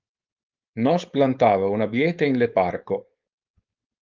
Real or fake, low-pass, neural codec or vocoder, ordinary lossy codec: fake; 7.2 kHz; codec, 16 kHz, 4.8 kbps, FACodec; Opus, 24 kbps